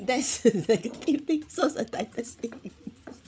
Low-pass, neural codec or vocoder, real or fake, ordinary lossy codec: none; codec, 16 kHz, 16 kbps, FreqCodec, larger model; fake; none